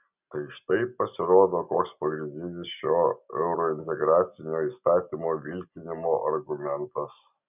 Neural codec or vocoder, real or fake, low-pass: none; real; 3.6 kHz